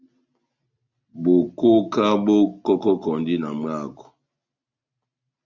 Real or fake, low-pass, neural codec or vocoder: real; 7.2 kHz; none